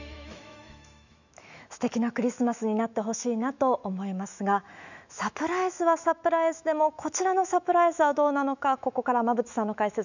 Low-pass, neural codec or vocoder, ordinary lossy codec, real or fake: 7.2 kHz; autoencoder, 48 kHz, 128 numbers a frame, DAC-VAE, trained on Japanese speech; none; fake